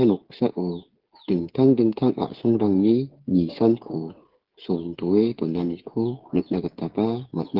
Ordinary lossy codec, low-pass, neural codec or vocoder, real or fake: Opus, 16 kbps; 5.4 kHz; codec, 16 kHz, 8 kbps, FreqCodec, smaller model; fake